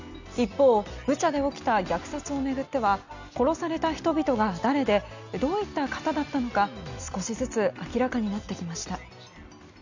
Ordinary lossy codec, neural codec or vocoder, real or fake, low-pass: none; none; real; 7.2 kHz